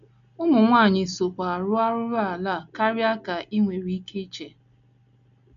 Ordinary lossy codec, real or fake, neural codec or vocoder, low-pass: none; real; none; 7.2 kHz